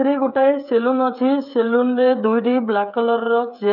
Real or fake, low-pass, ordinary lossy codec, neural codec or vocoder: fake; 5.4 kHz; none; codec, 16 kHz, 16 kbps, FreqCodec, smaller model